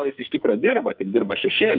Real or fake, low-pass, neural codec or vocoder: fake; 5.4 kHz; codec, 44.1 kHz, 2.6 kbps, SNAC